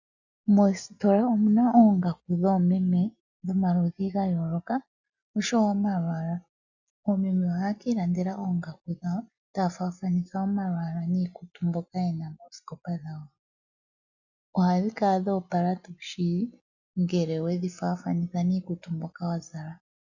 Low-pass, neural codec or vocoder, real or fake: 7.2 kHz; none; real